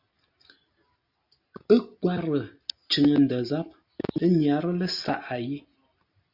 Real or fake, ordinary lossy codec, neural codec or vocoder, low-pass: real; Opus, 64 kbps; none; 5.4 kHz